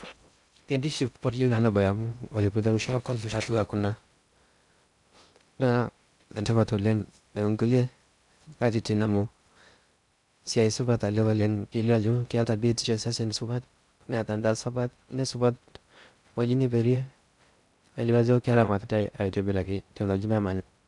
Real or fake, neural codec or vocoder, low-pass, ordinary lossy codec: fake; codec, 16 kHz in and 24 kHz out, 0.6 kbps, FocalCodec, streaming, 2048 codes; 10.8 kHz; none